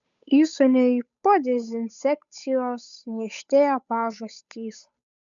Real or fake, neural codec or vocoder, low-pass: fake; codec, 16 kHz, 8 kbps, FunCodec, trained on Chinese and English, 25 frames a second; 7.2 kHz